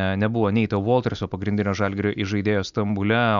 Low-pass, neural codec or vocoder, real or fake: 7.2 kHz; none; real